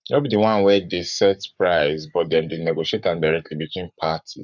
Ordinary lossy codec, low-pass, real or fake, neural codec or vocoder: none; 7.2 kHz; fake; codec, 44.1 kHz, 7.8 kbps, Pupu-Codec